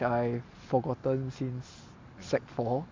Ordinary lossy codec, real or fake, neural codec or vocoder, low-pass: MP3, 64 kbps; real; none; 7.2 kHz